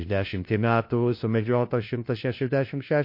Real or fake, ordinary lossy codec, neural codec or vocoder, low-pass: fake; MP3, 32 kbps; codec, 16 kHz, 1 kbps, FunCodec, trained on LibriTTS, 50 frames a second; 5.4 kHz